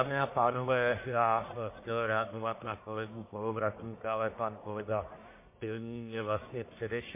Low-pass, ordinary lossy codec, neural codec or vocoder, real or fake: 3.6 kHz; MP3, 24 kbps; codec, 44.1 kHz, 1.7 kbps, Pupu-Codec; fake